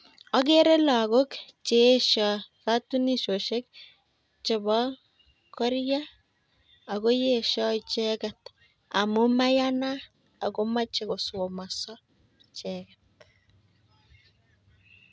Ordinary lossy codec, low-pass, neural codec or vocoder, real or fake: none; none; none; real